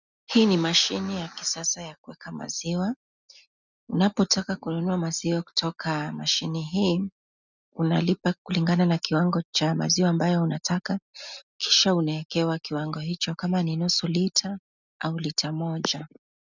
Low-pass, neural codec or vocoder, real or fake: 7.2 kHz; none; real